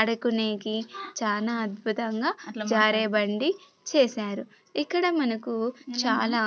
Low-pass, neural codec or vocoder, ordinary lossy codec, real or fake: none; none; none; real